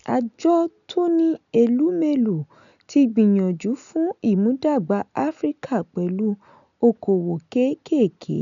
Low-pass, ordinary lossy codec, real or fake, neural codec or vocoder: 7.2 kHz; none; real; none